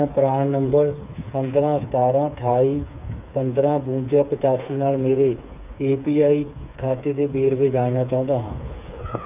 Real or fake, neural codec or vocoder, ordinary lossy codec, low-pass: fake; codec, 16 kHz, 4 kbps, FreqCodec, smaller model; none; 3.6 kHz